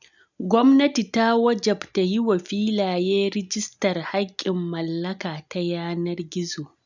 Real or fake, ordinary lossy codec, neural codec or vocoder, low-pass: real; none; none; 7.2 kHz